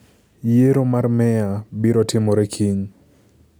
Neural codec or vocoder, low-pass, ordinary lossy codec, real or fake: none; none; none; real